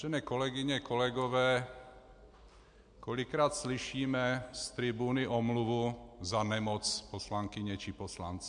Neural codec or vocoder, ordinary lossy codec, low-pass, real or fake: none; MP3, 64 kbps; 9.9 kHz; real